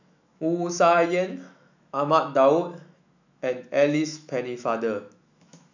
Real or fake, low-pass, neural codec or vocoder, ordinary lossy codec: fake; 7.2 kHz; autoencoder, 48 kHz, 128 numbers a frame, DAC-VAE, trained on Japanese speech; none